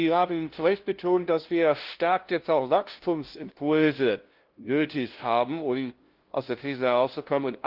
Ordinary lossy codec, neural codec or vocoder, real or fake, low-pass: Opus, 16 kbps; codec, 16 kHz, 0.5 kbps, FunCodec, trained on LibriTTS, 25 frames a second; fake; 5.4 kHz